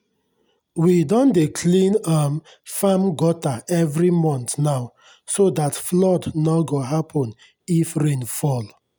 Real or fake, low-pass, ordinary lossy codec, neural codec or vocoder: real; none; none; none